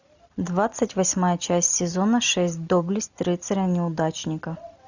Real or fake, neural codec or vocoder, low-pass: real; none; 7.2 kHz